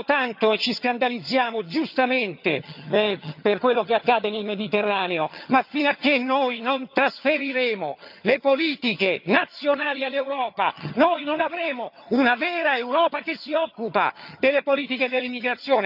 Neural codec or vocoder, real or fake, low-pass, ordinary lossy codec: vocoder, 22.05 kHz, 80 mel bands, HiFi-GAN; fake; 5.4 kHz; none